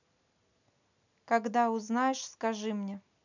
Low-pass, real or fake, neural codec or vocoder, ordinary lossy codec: 7.2 kHz; real; none; none